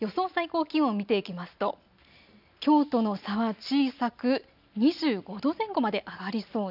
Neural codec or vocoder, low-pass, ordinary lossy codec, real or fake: none; 5.4 kHz; none; real